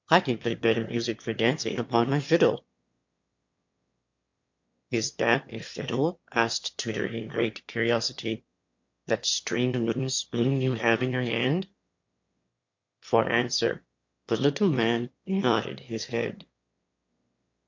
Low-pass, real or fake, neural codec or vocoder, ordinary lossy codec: 7.2 kHz; fake; autoencoder, 22.05 kHz, a latent of 192 numbers a frame, VITS, trained on one speaker; MP3, 48 kbps